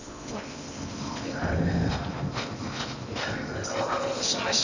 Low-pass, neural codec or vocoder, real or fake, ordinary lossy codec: 7.2 kHz; codec, 16 kHz in and 24 kHz out, 0.8 kbps, FocalCodec, streaming, 65536 codes; fake; none